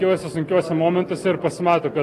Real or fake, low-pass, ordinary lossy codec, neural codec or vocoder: real; 14.4 kHz; AAC, 48 kbps; none